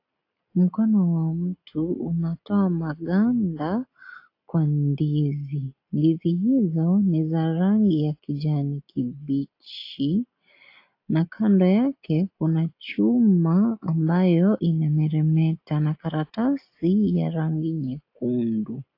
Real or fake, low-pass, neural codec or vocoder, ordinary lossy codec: real; 5.4 kHz; none; AAC, 32 kbps